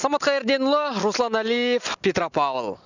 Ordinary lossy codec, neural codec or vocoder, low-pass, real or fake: none; none; 7.2 kHz; real